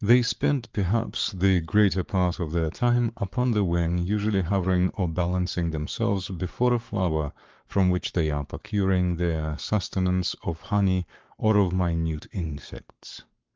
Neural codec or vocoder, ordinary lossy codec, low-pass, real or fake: codec, 44.1 kHz, 7.8 kbps, Pupu-Codec; Opus, 32 kbps; 7.2 kHz; fake